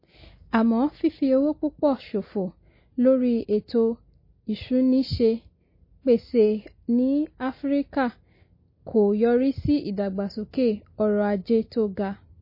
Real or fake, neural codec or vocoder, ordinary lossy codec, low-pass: real; none; MP3, 24 kbps; 5.4 kHz